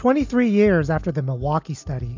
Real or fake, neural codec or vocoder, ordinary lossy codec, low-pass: real; none; MP3, 64 kbps; 7.2 kHz